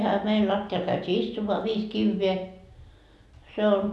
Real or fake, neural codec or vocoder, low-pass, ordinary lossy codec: real; none; none; none